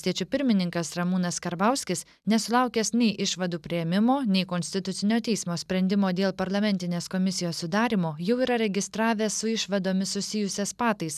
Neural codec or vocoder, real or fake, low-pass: none; real; 14.4 kHz